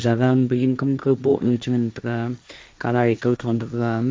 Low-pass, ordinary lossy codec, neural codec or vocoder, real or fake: none; none; codec, 16 kHz, 1.1 kbps, Voila-Tokenizer; fake